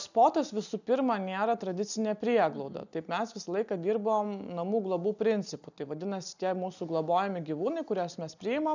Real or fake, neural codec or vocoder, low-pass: real; none; 7.2 kHz